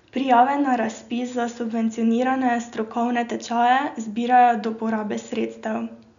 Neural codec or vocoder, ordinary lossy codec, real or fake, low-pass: none; none; real; 7.2 kHz